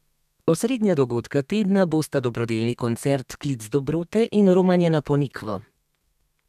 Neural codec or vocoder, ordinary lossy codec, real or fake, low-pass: codec, 32 kHz, 1.9 kbps, SNAC; none; fake; 14.4 kHz